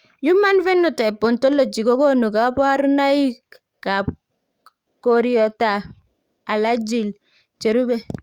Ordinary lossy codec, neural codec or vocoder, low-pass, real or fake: Opus, 64 kbps; codec, 44.1 kHz, 7.8 kbps, DAC; 19.8 kHz; fake